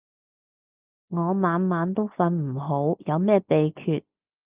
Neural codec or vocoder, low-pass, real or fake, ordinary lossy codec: none; 3.6 kHz; real; Opus, 24 kbps